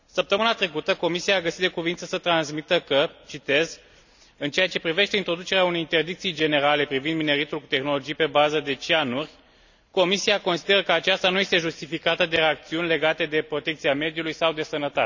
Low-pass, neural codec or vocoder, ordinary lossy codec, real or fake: 7.2 kHz; none; none; real